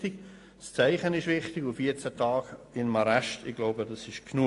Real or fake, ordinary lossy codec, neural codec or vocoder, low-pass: real; AAC, 48 kbps; none; 10.8 kHz